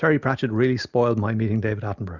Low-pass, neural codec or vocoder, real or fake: 7.2 kHz; none; real